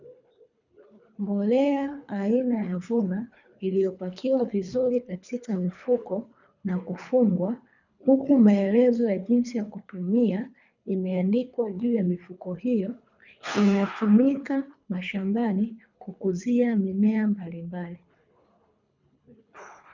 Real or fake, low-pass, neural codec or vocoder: fake; 7.2 kHz; codec, 24 kHz, 3 kbps, HILCodec